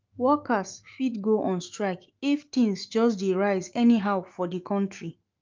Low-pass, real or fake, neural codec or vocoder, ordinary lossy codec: 7.2 kHz; fake; autoencoder, 48 kHz, 128 numbers a frame, DAC-VAE, trained on Japanese speech; Opus, 32 kbps